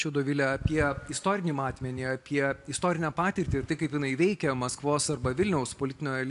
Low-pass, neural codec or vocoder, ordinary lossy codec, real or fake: 10.8 kHz; none; AAC, 64 kbps; real